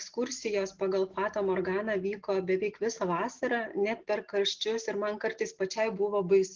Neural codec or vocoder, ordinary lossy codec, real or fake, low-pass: none; Opus, 16 kbps; real; 7.2 kHz